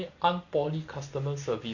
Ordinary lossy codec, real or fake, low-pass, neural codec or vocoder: none; real; 7.2 kHz; none